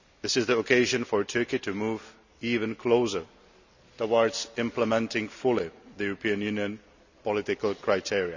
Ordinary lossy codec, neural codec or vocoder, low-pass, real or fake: none; none; 7.2 kHz; real